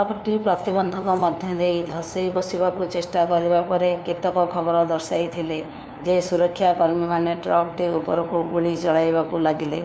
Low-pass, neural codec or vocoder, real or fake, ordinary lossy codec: none; codec, 16 kHz, 2 kbps, FunCodec, trained on LibriTTS, 25 frames a second; fake; none